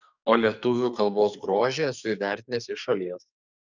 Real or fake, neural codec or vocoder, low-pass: fake; codec, 44.1 kHz, 2.6 kbps, SNAC; 7.2 kHz